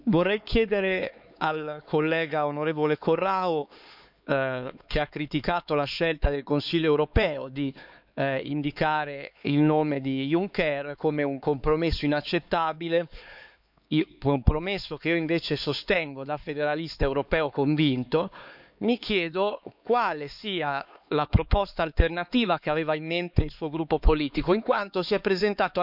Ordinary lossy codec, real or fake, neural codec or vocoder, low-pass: none; fake; codec, 16 kHz, 4 kbps, X-Codec, HuBERT features, trained on LibriSpeech; 5.4 kHz